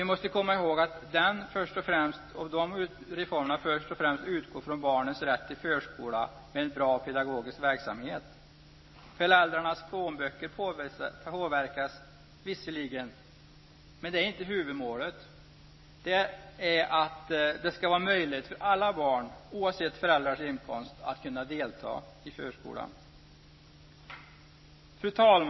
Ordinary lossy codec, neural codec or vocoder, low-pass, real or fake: MP3, 24 kbps; none; 7.2 kHz; real